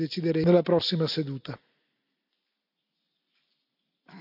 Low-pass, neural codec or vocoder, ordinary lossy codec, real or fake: 5.4 kHz; none; none; real